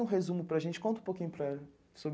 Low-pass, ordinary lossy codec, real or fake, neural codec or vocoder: none; none; real; none